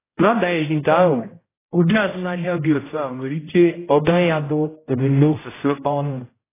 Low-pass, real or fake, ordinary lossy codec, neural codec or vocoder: 3.6 kHz; fake; AAC, 16 kbps; codec, 16 kHz, 0.5 kbps, X-Codec, HuBERT features, trained on general audio